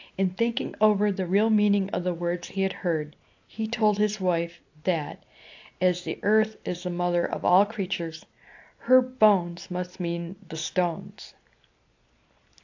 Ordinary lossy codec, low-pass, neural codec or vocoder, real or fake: AAC, 48 kbps; 7.2 kHz; none; real